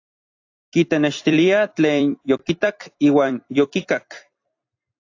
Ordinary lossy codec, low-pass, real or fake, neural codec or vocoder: AAC, 48 kbps; 7.2 kHz; fake; vocoder, 44.1 kHz, 128 mel bands every 256 samples, BigVGAN v2